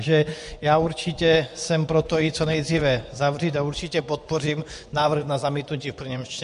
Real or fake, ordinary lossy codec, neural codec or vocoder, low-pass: fake; MP3, 64 kbps; vocoder, 24 kHz, 100 mel bands, Vocos; 10.8 kHz